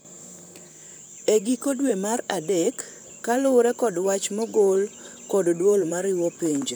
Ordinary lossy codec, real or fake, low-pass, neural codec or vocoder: none; fake; none; vocoder, 44.1 kHz, 128 mel bands, Pupu-Vocoder